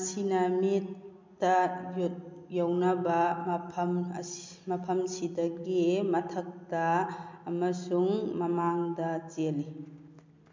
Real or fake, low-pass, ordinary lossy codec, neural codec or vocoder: real; 7.2 kHz; MP3, 64 kbps; none